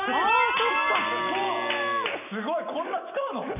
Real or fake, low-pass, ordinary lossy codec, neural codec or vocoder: real; 3.6 kHz; AAC, 32 kbps; none